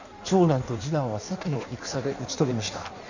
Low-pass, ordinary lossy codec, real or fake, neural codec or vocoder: 7.2 kHz; none; fake; codec, 16 kHz in and 24 kHz out, 1.1 kbps, FireRedTTS-2 codec